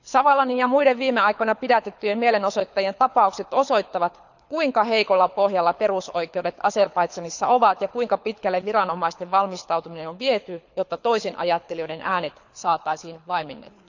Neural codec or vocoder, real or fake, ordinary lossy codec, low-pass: codec, 24 kHz, 6 kbps, HILCodec; fake; none; 7.2 kHz